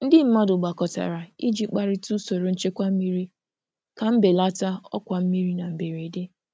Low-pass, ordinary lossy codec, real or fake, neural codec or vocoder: none; none; real; none